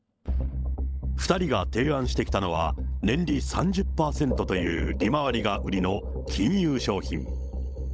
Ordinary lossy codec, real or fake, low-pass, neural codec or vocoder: none; fake; none; codec, 16 kHz, 16 kbps, FunCodec, trained on LibriTTS, 50 frames a second